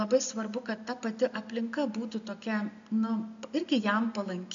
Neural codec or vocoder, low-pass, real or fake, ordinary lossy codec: none; 7.2 kHz; real; MP3, 64 kbps